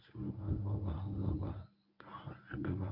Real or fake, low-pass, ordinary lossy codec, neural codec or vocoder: fake; 5.4 kHz; none; codec, 24 kHz, 0.9 kbps, WavTokenizer, medium speech release version 1